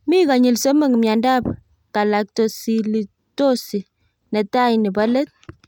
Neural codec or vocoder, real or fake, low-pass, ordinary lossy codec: none; real; 19.8 kHz; none